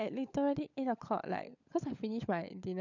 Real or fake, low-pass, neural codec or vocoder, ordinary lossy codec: fake; 7.2 kHz; codec, 16 kHz, 16 kbps, FunCodec, trained on LibriTTS, 50 frames a second; none